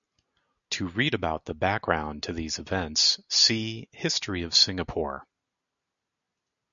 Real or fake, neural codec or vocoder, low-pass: real; none; 7.2 kHz